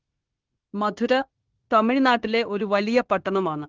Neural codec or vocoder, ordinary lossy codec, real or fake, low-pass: codec, 16 kHz, 0.9 kbps, LongCat-Audio-Codec; Opus, 16 kbps; fake; 7.2 kHz